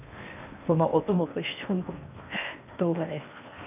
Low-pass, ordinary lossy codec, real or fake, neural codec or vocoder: 3.6 kHz; none; fake; codec, 16 kHz in and 24 kHz out, 0.8 kbps, FocalCodec, streaming, 65536 codes